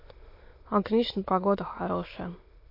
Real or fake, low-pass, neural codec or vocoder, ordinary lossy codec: fake; 5.4 kHz; autoencoder, 22.05 kHz, a latent of 192 numbers a frame, VITS, trained on many speakers; MP3, 32 kbps